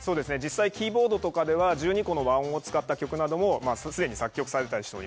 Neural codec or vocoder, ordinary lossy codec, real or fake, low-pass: none; none; real; none